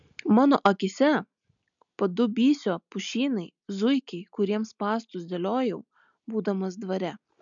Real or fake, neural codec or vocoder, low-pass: real; none; 7.2 kHz